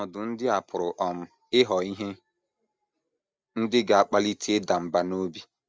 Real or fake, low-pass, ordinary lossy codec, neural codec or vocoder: real; none; none; none